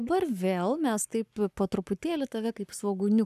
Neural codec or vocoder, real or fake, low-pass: codec, 44.1 kHz, 7.8 kbps, Pupu-Codec; fake; 14.4 kHz